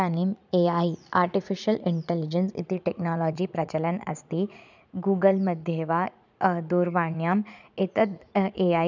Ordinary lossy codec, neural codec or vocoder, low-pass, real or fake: none; vocoder, 44.1 kHz, 80 mel bands, Vocos; 7.2 kHz; fake